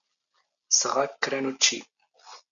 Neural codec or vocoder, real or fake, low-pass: none; real; 7.2 kHz